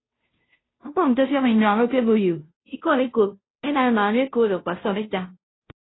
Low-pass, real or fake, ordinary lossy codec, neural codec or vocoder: 7.2 kHz; fake; AAC, 16 kbps; codec, 16 kHz, 0.5 kbps, FunCodec, trained on Chinese and English, 25 frames a second